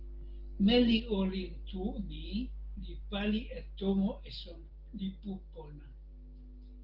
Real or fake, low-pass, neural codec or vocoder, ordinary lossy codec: real; 5.4 kHz; none; Opus, 16 kbps